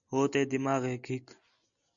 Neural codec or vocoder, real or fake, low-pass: none; real; 7.2 kHz